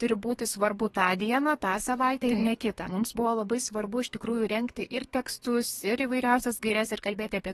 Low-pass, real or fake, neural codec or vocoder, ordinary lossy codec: 14.4 kHz; fake; codec, 32 kHz, 1.9 kbps, SNAC; AAC, 32 kbps